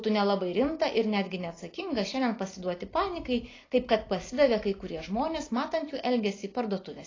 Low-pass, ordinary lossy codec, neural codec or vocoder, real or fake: 7.2 kHz; AAC, 32 kbps; none; real